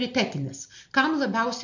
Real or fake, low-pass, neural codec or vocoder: real; 7.2 kHz; none